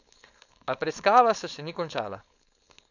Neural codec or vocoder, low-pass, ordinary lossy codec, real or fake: codec, 16 kHz, 4.8 kbps, FACodec; 7.2 kHz; none; fake